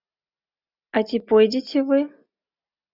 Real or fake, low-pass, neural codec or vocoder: real; 5.4 kHz; none